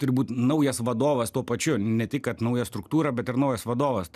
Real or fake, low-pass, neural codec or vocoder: real; 14.4 kHz; none